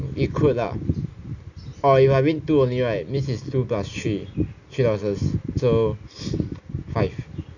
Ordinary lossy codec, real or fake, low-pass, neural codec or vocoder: none; real; 7.2 kHz; none